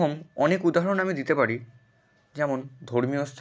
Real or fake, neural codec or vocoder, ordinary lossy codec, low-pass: real; none; none; none